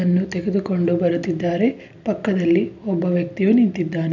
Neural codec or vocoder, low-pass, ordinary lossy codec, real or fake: none; 7.2 kHz; none; real